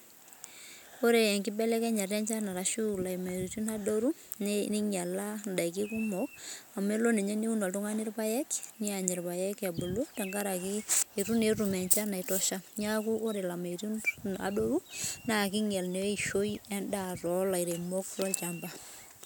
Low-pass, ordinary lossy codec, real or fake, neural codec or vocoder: none; none; real; none